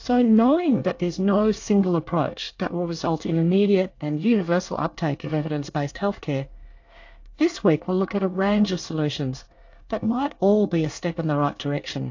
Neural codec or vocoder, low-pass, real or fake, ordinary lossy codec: codec, 24 kHz, 1 kbps, SNAC; 7.2 kHz; fake; AAC, 48 kbps